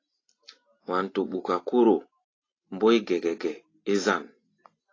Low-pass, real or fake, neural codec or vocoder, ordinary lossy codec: 7.2 kHz; real; none; AAC, 32 kbps